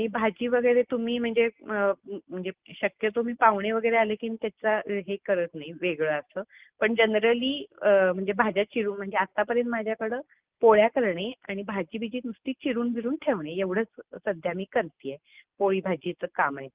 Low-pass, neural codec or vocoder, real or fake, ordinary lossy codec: 3.6 kHz; none; real; Opus, 32 kbps